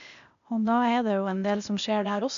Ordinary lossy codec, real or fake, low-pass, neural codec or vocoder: none; fake; 7.2 kHz; codec, 16 kHz, 0.8 kbps, ZipCodec